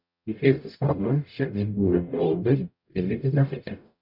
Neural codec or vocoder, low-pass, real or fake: codec, 44.1 kHz, 0.9 kbps, DAC; 5.4 kHz; fake